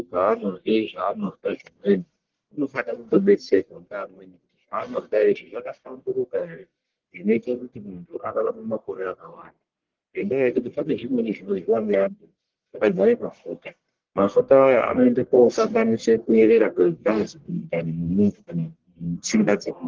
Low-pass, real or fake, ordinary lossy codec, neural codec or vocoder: 7.2 kHz; fake; Opus, 16 kbps; codec, 44.1 kHz, 1.7 kbps, Pupu-Codec